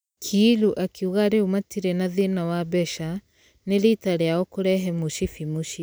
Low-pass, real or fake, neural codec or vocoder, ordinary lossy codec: none; real; none; none